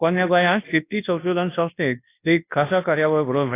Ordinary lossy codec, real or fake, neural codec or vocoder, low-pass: AAC, 24 kbps; fake; codec, 24 kHz, 0.9 kbps, WavTokenizer, large speech release; 3.6 kHz